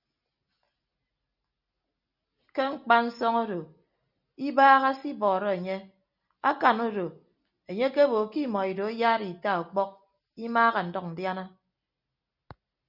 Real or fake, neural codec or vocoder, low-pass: real; none; 5.4 kHz